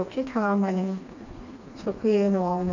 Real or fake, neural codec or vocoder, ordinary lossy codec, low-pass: fake; codec, 16 kHz, 2 kbps, FreqCodec, smaller model; none; 7.2 kHz